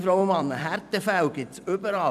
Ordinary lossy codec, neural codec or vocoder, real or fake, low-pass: none; vocoder, 44.1 kHz, 128 mel bands every 256 samples, BigVGAN v2; fake; 14.4 kHz